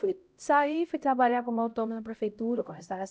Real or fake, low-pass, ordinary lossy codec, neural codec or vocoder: fake; none; none; codec, 16 kHz, 0.5 kbps, X-Codec, HuBERT features, trained on LibriSpeech